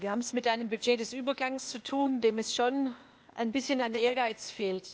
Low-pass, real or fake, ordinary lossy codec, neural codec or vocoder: none; fake; none; codec, 16 kHz, 0.8 kbps, ZipCodec